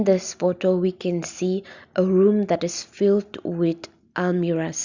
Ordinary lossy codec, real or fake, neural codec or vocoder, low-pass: Opus, 64 kbps; real; none; 7.2 kHz